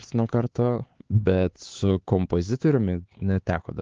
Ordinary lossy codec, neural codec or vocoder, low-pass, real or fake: Opus, 16 kbps; codec, 16 kHz, 4 kbps, X-Codec, HuBERT features, trained on LibriSpeech; 7.2 kHz; fake